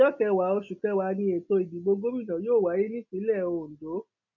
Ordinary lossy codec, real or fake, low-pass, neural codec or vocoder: none; real; 7.2 kHz; none